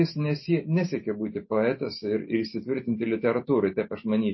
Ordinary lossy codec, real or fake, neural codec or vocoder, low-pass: MP3, 24 kbps; real; none; 7.2 kHz